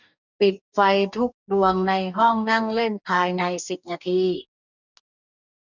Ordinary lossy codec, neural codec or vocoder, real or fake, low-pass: none; codec, 44.1 kHz, 2.6 kbps, DAC; fake; 7.2 kHz